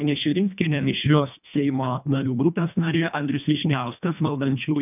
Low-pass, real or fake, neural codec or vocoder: 3.6 kHz; fake; codec, 24 kHz, 1.5 kbps, HILCodec